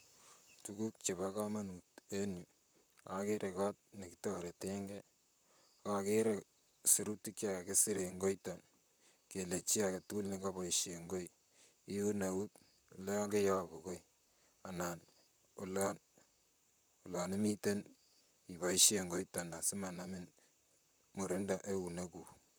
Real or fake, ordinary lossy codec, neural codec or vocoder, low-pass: fake; none; vocoder, 44.1 kHz, 128 mel bands, Pupu-Vocoder; none